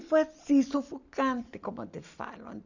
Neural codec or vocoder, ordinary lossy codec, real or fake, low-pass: none; none; real; 7.2 kHz